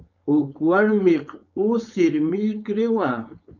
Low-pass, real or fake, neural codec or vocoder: 7.2 kHz; fake; codec, 16 kHz, 4.8 kbps, FACodec